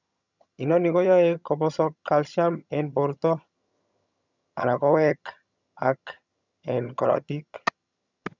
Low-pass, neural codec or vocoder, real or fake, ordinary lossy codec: 7.2 kHz; vocoder, 22.05 kHz, 80 mel bands, HiFi-GAN; fake; none